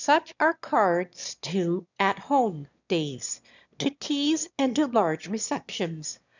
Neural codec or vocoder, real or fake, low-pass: autoencoder, 22.05 kHz, a latent of 192 numbers a frame, VITS, trained on one speaker; fake; 7.2 kHz